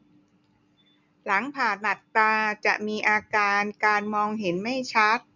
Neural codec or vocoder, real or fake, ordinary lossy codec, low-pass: none; real; none; 7.2 kHz